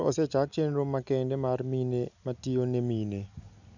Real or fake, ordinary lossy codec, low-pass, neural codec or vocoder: real; none; 7.2 kHz; none